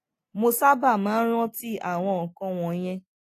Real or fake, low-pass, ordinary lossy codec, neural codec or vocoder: real; 14.4 kHz; AAC, 48 kbps; none